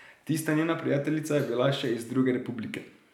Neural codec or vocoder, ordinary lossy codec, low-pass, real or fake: none; none; 19.8 kHz; real